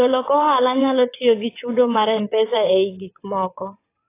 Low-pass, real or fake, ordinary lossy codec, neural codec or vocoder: 3.6 kHz; fake; AAC, 24 kbps; vocoder, 22.05 kHz, 80 mel bands, WaveNeXt